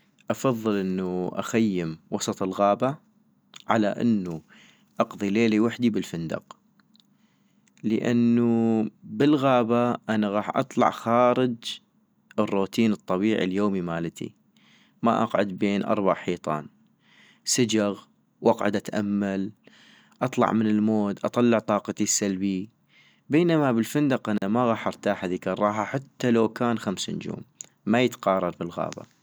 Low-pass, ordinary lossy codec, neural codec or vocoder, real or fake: none; none; none; real